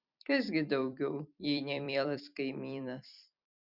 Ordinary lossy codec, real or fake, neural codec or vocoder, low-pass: Opus, 64 kbps; fake; vocoder, 44.1 kHz, 128 mel bands every 256 samples, BigVGAN v2; 5.4 kHz